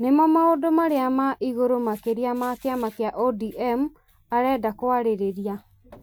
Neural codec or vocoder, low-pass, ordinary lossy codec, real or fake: none; none; none; real